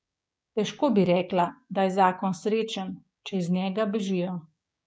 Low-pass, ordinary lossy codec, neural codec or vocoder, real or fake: none; none; codec, 16 kHz, 6 kbps, DAC; fake